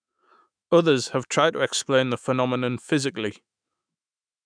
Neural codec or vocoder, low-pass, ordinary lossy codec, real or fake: autoencoder, 48 kHz, 128 numbers a frame, DAC-VAE, trained on Japanese speech; 9.9 kHz; none; fake